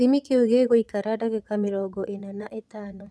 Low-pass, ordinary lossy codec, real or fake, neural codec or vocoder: none; none; fake; vocoder, 22.05 kHz, 80 mel bands, Vocos